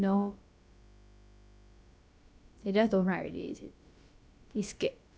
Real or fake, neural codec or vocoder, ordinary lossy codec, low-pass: fake; codec, 16 kHz, about 1 kbps, DyCAST, with the encoder's durations; none; none